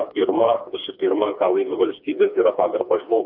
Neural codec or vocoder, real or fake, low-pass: codec, 16 kHz, 2 kbps, FreqCodec, smaller model; fake; 5.4 kHz